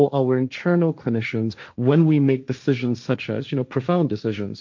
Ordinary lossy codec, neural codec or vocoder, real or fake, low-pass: MP3, 48 kbps; codec, 16 kHz, 1.1 kbps, Voila-Tokenizer; fake; 7.2 kHz